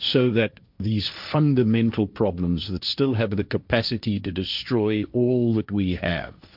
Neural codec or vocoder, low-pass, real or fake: codec, 16 kHz, 1.1 kbps, Voila-Tokenizer; 5.4 kHz; fake